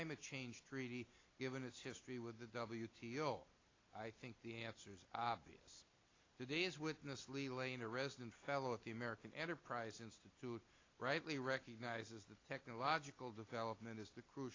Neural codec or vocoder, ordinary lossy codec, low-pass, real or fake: none; AAC, 32 kbps; 7.2 kHz; real